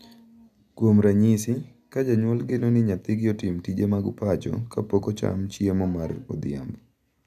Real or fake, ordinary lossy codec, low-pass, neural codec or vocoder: real; none; 14.4 kHz; none